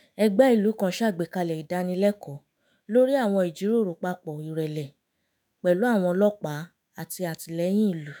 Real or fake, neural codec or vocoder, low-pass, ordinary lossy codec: fake; autoencoder, 48 kHz, 128 numbers a frame, DAC-VAE, trained on Japanese speech; none; none